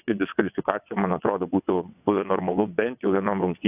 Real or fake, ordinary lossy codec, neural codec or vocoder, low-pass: fake; AAC, 32 kbps; vocoder, 22.05 kHz, 80 mel bands, WaveNeXt; 3.6 kHz